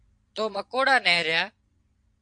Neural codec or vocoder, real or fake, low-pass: vocoder, 22.05 kHz, 80 mel bands, Vocos; fake; 9.9 kHz